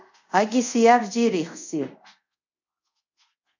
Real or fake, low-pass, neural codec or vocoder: fake; 7.2 kHz; codec, 24 kHz, 0.5 kbps, DualCodec